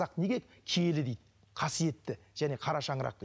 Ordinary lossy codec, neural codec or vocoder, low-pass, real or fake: none; none; none; real